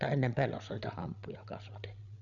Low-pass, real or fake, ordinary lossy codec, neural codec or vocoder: 7.2 kHz; fake; none; codec, 16 kHz, 16 kbps, FreqCodec, larger model